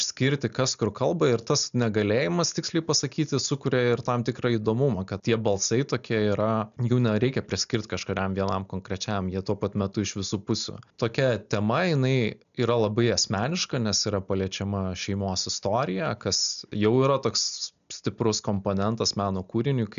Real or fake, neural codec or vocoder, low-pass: real; none; 7.2 kHz